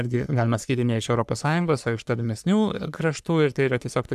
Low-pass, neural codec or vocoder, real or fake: 14.4 kHz; codec, 44.1 kHz, 3.4 kbps, Pupu-Codec; fake